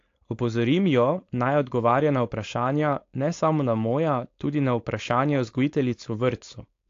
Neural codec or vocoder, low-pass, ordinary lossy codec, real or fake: codec, 16 kHz, 4.8 kbps, FACodec; 7.2 kHz; AAC, 48 kbps; fake